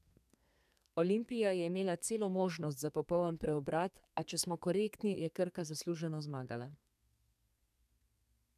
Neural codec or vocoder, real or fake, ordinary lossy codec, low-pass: codec, 32 kHz, 1.9 kbps, SNAC; fake; none; 14.4 kHz